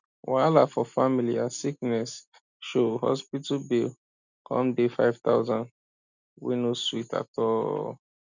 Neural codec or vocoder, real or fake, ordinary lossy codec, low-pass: none; real; none; 7.2 kHz